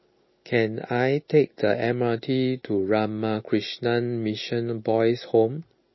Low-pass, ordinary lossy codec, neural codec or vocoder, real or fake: 7.2 kHz; MP3, 24 kbps; none; real